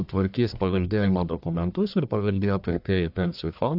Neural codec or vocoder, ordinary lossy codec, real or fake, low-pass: codec, 44.1 kHz, 1.7 kbps, Pupu-Codec; AAC, 48 kbps; fake; 5.4 kHz